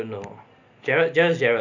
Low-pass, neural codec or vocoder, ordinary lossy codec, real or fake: 7.2 kHz; none; none; real